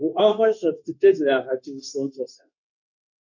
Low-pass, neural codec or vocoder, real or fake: 7.2 kHz; codec, 16 kHz, 0.9 kbps, LongCat-Audio-Codec; fake